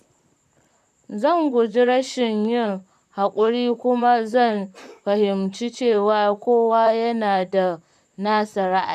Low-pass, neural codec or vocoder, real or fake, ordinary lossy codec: 14.4 kHz; vocoder, 44.1 kHz, 128 mel bands, Pupu-Vocoder; fake; none